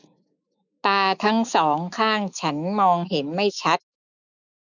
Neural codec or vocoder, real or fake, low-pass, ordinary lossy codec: autoencoder, 48 kHz, 128 numbers a frame, DAC-VAE, trained on Japanese speech; fake; 7.2 kHz; none